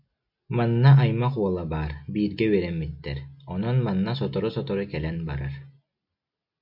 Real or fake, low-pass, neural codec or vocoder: real; 5.4 kHz; none